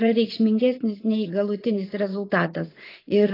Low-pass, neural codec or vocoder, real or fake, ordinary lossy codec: 5.4 kHz; vocoder, 44.1 kHz, 80 mel bands, Vocos; fake; AAC, 24 kbps